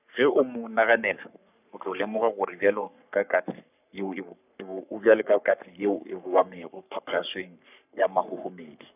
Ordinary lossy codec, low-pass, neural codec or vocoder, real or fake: none; 3.6 kHz; codec, 44.1 kHz, 3.4 kbps, Pupu-Codec; fake